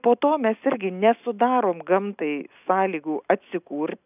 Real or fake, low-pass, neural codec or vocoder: real; 3.6 kHz; none